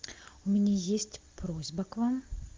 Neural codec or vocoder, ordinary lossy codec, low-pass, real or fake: none; Opus, 32 kbps; 7.2 kHz; real